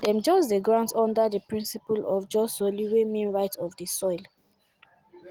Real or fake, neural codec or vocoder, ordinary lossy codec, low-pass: real; none; Opus, 24 kbps; 19.8 kHz